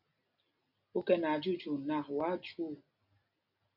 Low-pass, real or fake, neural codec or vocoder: 5.4 kHz; real; none